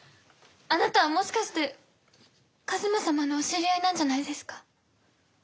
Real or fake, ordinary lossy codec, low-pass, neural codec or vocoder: real; none; none; none